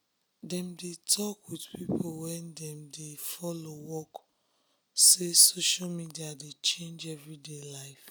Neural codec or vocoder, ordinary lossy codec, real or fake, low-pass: none; none; real; none